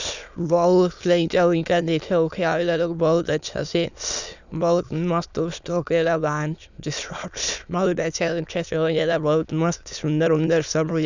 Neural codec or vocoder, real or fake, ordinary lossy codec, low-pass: autoencoder, 22.05 kHz, a latent of 192 numbers a frame, VITS, trained on many speakers; fake; none; 7.2 kHz